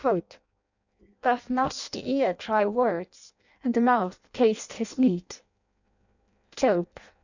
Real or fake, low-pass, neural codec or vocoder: fake; 7.2 kHz; codec, 16 kHz in and 24 kHz out, 0.6 kbps, FireRedTTS-2 codec